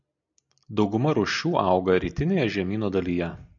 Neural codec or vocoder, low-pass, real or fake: none; 7.2 kHz; real